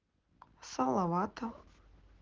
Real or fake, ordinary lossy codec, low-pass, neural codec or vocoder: real; Opus, 16 kbps; 7.2 kHz; none